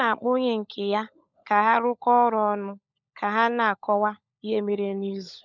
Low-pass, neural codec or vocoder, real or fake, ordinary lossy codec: 7.2 kHz; codec, 16 kHz, 16 kbps, FunCodec, trained on LibriTTS, 50 frames a second; fake; none